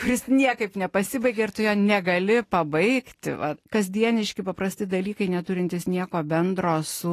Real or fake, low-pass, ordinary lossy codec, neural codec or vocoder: real; 14.4 kHz; AAC, 48 kbps; none